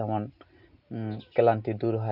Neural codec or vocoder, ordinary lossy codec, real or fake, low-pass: none; none; real; 5.4 kHz